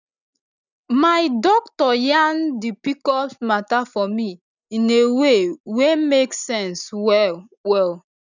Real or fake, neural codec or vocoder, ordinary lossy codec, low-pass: real; none; none; 7.2 kHz